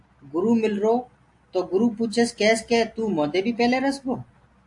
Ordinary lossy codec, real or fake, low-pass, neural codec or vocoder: AAC, 64 kbps; real; 10.8 kHz; none